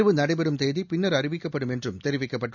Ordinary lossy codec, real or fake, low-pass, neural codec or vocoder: none; real; 7.2 kHz; none